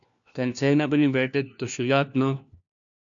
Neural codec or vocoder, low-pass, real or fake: codec, 16 kHz, 1 kbps, FunCodec, trained on LibriTTS, 50 frames a second; 7.2 kHz; fake